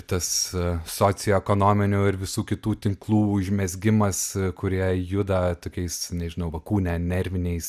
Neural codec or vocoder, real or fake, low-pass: none; real; 14.4 kHz